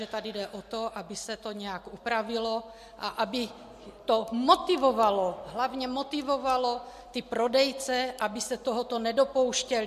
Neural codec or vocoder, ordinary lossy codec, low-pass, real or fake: none; MP3, 64 kbps; 14.4 kHz; real